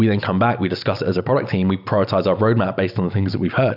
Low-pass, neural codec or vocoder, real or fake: 5.4 kHz; none; real